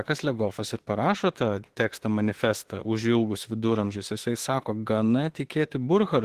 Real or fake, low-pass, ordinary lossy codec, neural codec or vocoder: fake; 14.4 kHz; Opus, 16 kbps; autoencoder, 48 kHz, 32 numbers a frame, DAC-VAE, trained on Japanese speech